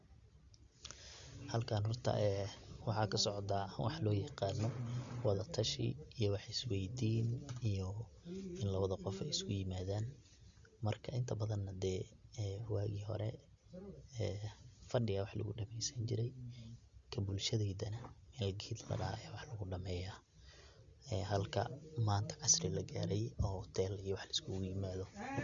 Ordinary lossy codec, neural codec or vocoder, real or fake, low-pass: Opus, 64 kbps; none; real; 7.2 kHz